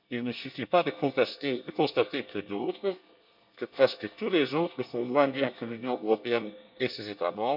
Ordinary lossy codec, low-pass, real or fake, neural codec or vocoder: none; 5.4 kHz; fake; codec, 24 kHz, 1 kbps, SNAC